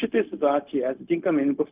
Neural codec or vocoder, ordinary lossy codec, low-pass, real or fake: codec, 16 kHz, 0.4 kbps, LongCat-Audio-Codec; Opus, 24 kbps; 3.6 kHz; fake